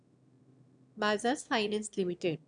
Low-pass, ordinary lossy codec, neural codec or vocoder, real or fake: 9.9 kHz; none; autoencoder, 22.05 kHz, a latent of 192 numbers a frame, VITS, trained on one speaker; fake